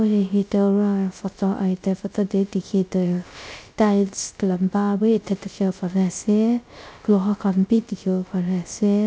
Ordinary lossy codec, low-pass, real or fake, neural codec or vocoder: none; none; fake; codec, 16 kHz, 0.3 kbps, FocalCodec